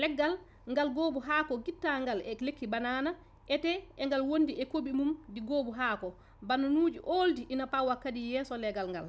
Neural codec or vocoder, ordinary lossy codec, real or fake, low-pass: none; none; real; none